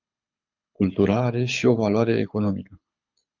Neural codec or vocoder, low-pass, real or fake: codec, 24 kHz, 6 kbps, HILCodec; 7.2 kHz; fake